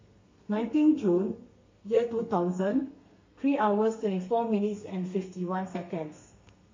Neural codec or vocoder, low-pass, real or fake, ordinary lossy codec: codec, 32 kHz, 1.9 kbps, SNAC; 7.2 kHz; fake; MP3, 32 kbps